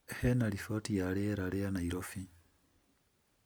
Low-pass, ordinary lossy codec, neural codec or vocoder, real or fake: none; none; none; real